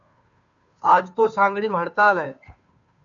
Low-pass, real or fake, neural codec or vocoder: 7.2 kHz; fake; codec, 16 kHz, 2 kbps, FunCodec, trained on Chinese and English, 25 frames a second